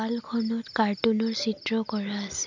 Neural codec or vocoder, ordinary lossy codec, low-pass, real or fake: none; none; 7.2 kHz; real